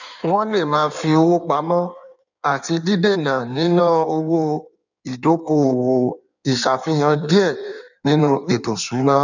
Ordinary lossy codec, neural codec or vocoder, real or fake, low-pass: none; codec, 16 kHz in and 24 kHz out, 1.1 kbps, FireRedTTS-2 codec; fake; 7.2 kHz